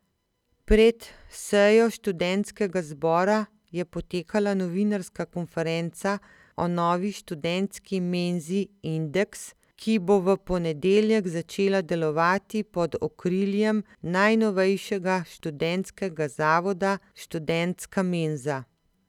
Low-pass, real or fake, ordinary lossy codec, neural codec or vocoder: 19.8 kHz; real; none; none